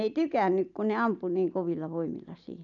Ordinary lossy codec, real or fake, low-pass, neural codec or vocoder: none; real; 7.2 kHz; none